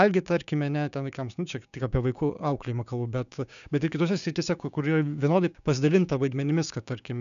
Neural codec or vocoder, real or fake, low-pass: codec, 16 kHz, 6 kbps, DAC; fake; 7.2 kHz